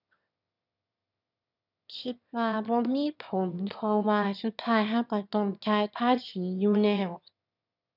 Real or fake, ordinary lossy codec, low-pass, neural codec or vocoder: fake; none; 5.4 kHz; autoencoder, 22.05 kHz, a latent of 192 numbers a frame, VITS, trained on one speaker